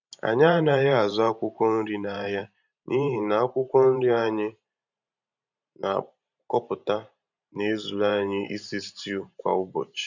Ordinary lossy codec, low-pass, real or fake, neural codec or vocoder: none; 7.2 kHz; fake; vocoder, 44.1 kHz, 128 mel bands every 512 samples, BigVGAN v2